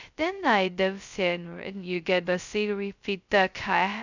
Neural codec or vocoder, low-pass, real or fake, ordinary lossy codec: codec, 16 kHz, 0.2 kbps, FocalCodec; 7.2 kHz; fake; none